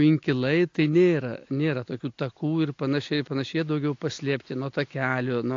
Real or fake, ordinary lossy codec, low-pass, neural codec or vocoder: real; AAC, 48 kbps; 7.2 kHz; none